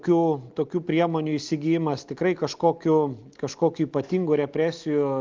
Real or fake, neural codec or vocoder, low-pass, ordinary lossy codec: real; none; 7.2 kHz; Opus, 32 kbps